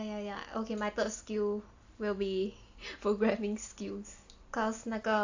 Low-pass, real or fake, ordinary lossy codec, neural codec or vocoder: 7.2 kHz; real; AAC, 48 kbps; none